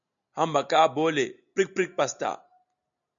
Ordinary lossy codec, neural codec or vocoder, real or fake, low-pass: MP3, 96 kbps; none; real; 7.2 kHz